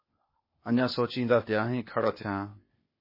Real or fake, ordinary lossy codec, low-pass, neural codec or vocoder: fake; MP3, 24 kbps; 5.4 kHz; codec, 16 kHz, 0.8 kbps, ZipCodec